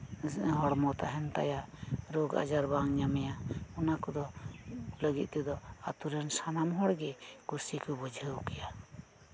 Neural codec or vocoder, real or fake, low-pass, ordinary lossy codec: none; real; none; none